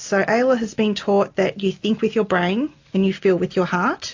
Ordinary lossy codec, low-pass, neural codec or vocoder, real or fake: AAC, 48 kbps; 7.2 kHz; none; real